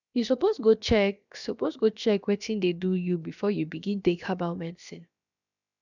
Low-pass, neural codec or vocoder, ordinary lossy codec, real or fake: 7.2 kHz; codec, 16 kHz, about 1 kbps, DyCAST, with the encoder's durations; none; fake